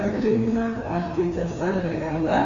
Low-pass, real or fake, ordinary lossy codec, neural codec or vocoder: 7.2 kHz; fake; Opus, 64 kbps; codec, 16 kHz, 2 kbps, FreqCodec, larger model